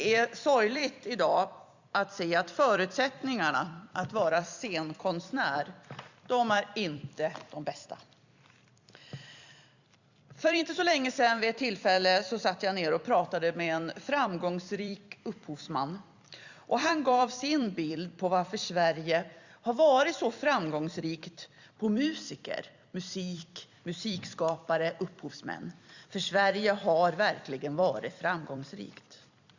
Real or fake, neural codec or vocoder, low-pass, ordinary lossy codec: fake; vocoder, 44.1 kHz, 128 mel bands every 256 samples, BigVGAN v2; 7.2 kHz; Opus, 64 kbps